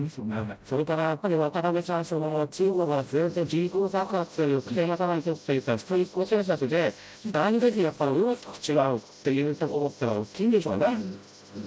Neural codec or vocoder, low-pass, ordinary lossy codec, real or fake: codec, 16 kHz, 0.5 kbps, FreqCodec, smaller model; none; none; fake